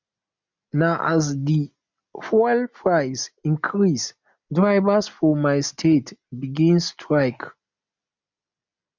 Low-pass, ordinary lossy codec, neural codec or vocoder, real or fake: 7.2 kHz; MP3, 64 kbps; none; real